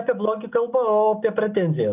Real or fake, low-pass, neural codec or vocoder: real; 3.6 kHz; none